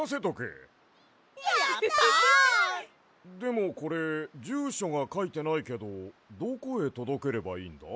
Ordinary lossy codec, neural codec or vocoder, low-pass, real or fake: none; none; none; real